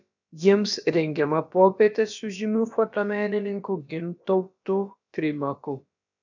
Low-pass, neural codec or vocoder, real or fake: 7.2 kHz; codec, 16 kHz, about 1 kbps, DyCAST, with the encoder's durations; fake